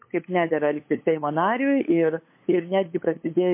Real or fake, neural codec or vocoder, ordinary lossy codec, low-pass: fake; codec, 16 kHz, 8 kbps, FunCodec, trained on LibriTTS, 25 frames a second; MP3, 24 kbps; 3.6 kHz